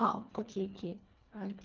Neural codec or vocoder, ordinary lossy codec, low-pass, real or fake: codec, 24 kHz, 1.5 kbps, HILCodec; Opus, 32 kbps; 7.2 kHz; fake